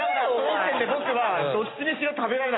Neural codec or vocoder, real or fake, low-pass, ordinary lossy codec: none; real; 7.2 kHz; AAC, 16 kbps